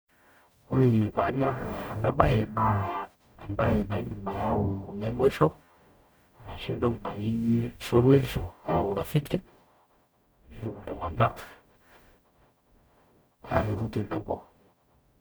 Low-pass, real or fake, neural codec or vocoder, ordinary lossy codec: none; fake; codec, 44.1 kHz, 0.9 kbps, DAC; none